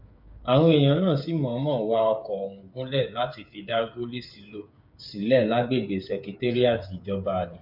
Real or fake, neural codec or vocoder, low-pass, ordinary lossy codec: fake; codec, 16 kHz, 8 kbps, FreqCodec, smaller model; 5.4 kHz; none